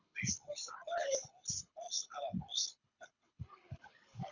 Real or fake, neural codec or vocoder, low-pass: fake; codec, 24 kHz, 6 kbps, HILCodec; 7.2 kHz